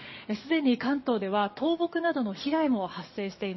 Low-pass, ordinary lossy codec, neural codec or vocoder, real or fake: 7.2 kHz; MP3, 24 kbps; codec, 44.1 kHz, 7.8 kbps, DAC; fake